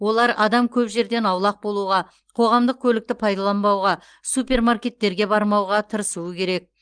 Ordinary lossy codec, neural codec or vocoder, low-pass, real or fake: Opus, 24 kbps; none; 9.9 kHz; real